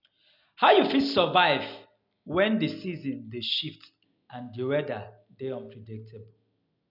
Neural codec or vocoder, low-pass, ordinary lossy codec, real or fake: none; 5.4 kHz; none; real